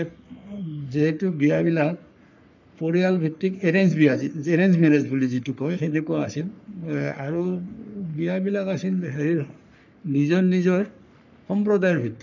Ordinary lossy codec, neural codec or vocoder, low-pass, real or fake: none; codec, 44.1 kHz, 3.4 kbps, Pupu-Codec; 7.2 kHz; fake